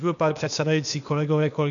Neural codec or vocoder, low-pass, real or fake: codec, 16 kHz, 0.8 kbps, ZipCodec; 7.2 kHz; fake